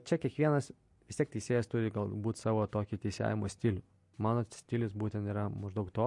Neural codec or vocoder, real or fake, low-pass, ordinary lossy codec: none; real; 10.8 kHz; MP3, 48 kbps